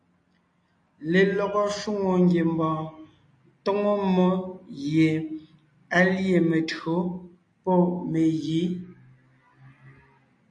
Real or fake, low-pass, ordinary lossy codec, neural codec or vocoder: real; 9.9 kHz; AAC, 48 kbps; none